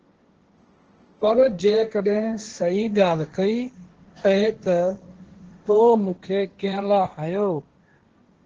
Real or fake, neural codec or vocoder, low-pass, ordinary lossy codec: fake; codec, 16 kHz, 1.1 kbps, Voila-Tokenizer; 7.2 kHz; Opus, 16 kbps